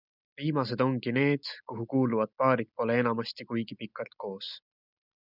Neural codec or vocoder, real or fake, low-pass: none; real; 5.4 kHz